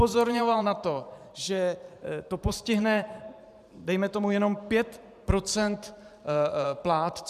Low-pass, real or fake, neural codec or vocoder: 14.4 kHz; fake; vocoder, 44.1 kHz, 128 mel bands every 512 samples, BigVGAN v2